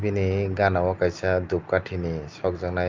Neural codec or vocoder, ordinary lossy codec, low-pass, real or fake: none; Opus, 24 kbps; 7.2 kHz; real